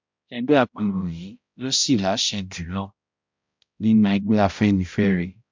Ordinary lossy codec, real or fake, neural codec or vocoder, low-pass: MP3, 64 kbps; fake; codec, 16 kHz, 0.5 kbps, X-Codec, HuBERT features, trained on balanced general audio; 7.2 kHz